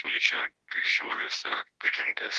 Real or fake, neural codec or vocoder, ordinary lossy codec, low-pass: fake; codec, 24 kHz, 0.9 kbps, WavTokenizer, large speech release; Opus, 16 kbps; 10.8 kHz